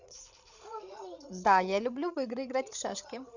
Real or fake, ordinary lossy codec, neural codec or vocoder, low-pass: fake; none; codec, 16 kHz, 8 kbps, FreqCodec, larger model; 7.2 kHz